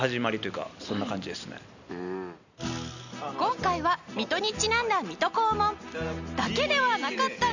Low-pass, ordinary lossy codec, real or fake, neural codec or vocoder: 7.2 kHz; none; real; none